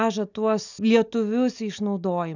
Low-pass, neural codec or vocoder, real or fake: 7.2 kHz; none; real